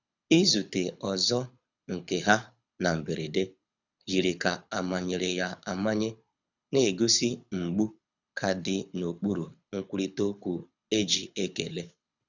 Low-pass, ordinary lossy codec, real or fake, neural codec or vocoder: 7.2 kHz; none; fake; codec, 24 kHz, 6 kbps, HILCodec